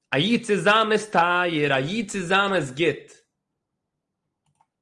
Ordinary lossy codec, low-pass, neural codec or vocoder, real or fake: Opus, 32 kbps; 10.8 kHz; none; real